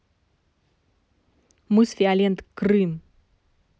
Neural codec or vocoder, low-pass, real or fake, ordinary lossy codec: none; none; real; none